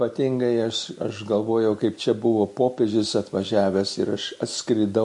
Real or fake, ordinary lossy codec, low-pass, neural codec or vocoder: real; MP3, 48 kbps; 10.8 kHz; none